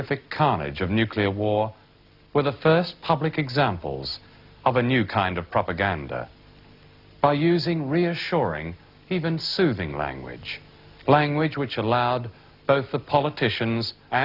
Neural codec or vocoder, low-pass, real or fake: none; 5.4 kHz; real